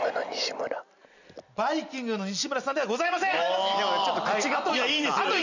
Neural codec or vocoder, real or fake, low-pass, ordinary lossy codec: none; real; 7.2 kHz; none